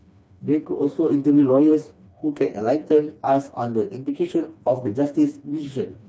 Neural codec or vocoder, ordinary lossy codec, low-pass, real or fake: codec, 16 kHz, 2 kbps, FreqCodec, smaller model; none; none; fake